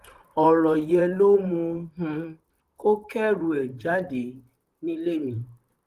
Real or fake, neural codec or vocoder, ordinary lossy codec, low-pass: fake; vocoder, 44.1 kHz, 128 mel bands, Pupu-Vocoder; Opus, 24 kbps; 14.4 kHz